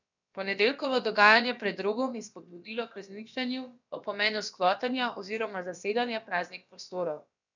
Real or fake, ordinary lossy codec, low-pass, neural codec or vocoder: fake; none; 7.2 kHz; codec, 16 kHz, about 1 kbps, DyCAST, with the encoder's durations